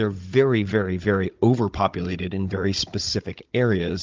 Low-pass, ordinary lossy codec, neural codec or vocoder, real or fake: 7.2 kHz; Opus, 24 kbps; codec, 16 kHz, 16 kbps, FunCodec, trained on Chinese and English, 50 frames a second; fake